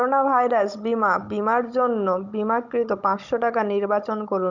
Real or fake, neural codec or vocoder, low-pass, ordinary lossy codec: fake; codec, 16 kHz, 16 kbps, FunCodec, trained on Chinese and English, 50 frames a second; 7.2 kHz; none